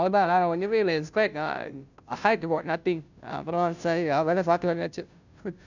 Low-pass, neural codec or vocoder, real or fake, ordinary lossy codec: 7.2 kHz; codec, 16 kHz, 0.5 kbps, FunCodec, trained on Chinese and English, 25 frames a second; fake; none